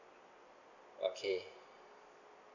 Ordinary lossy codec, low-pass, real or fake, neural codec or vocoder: none; 7.2 kHz; real; none